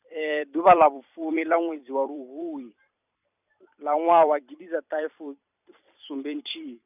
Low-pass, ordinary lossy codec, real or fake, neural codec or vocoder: 3.6 kHz; none; fake; vocoder, 44.1 kHz, 128 mel bands every 256 samples, BigVGAN v2